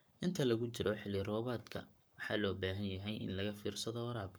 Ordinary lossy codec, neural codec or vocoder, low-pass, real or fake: none; codec, 44.1 kHz, 7.8 kbps, Pupu-Codec; none; fake